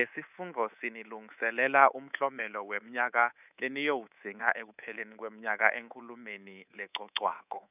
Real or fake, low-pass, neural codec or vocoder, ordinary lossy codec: fake; 3.6 kHz; codec, 24 kHz, 3.1 kbps, DualCodec; none